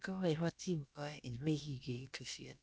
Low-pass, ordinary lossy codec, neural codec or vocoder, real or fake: none; none; codec, 16 kHz, about 1 kbps, DyCAST, with the encoder's durations; fake